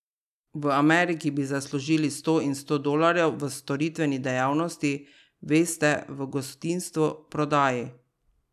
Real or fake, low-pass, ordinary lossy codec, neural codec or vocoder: real; 14.4 kHz; none; none